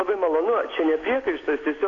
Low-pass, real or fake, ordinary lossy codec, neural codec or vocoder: 7.2 kHz; real; AAC, 32 kbps; none